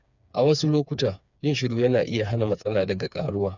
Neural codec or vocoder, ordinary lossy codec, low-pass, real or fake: codec, 16 kHz, 4 kbps, FreqCodec, smaller model; none; 7.2 kHz; fake